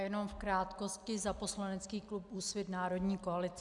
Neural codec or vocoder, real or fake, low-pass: none; real; 10.8 kHz